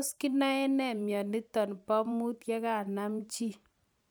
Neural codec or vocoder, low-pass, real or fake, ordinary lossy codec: vocoder, 44.1 kHz, 128 mel bands every 512 samples, BigVGAN v2; none; fake; none